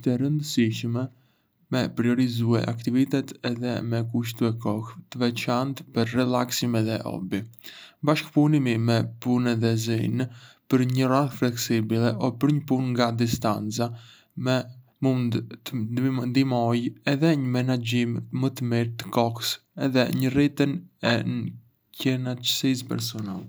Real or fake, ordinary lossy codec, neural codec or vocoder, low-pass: real; none; none; none